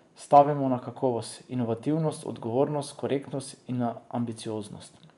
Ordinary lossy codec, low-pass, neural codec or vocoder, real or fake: none; 10.8 kHz; vocoder, 24 kHz, 100 mel bands, Vocos; fake